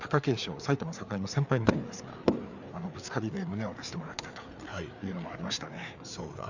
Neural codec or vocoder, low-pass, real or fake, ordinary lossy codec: codec, 16 kHz, 4 kbps, FreqCodec, larger model; 7.2 kHz; fake; none